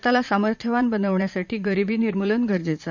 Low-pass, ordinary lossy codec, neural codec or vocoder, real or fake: 7.2 kHz; none; vocoder, 44.1 kHz, 80 mel bands, Vocos; fake